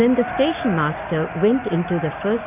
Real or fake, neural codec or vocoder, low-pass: real; none; 3.6 kHz